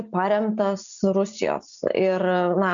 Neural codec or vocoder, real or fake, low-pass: none; real; 7.2 kHz